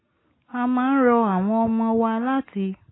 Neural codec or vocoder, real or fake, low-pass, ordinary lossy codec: none; real; 7.2 kHz; AAC, 16 kbps